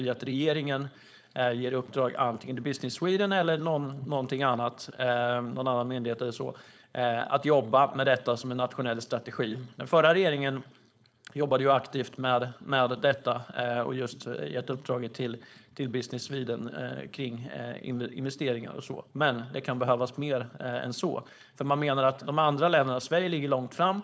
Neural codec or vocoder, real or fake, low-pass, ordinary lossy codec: codec, 16 kHz, 4.8 kbps, FACodec; fake; none; none